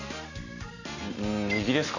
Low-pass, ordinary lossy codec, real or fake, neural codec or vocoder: 7.2 kHz; none; real; none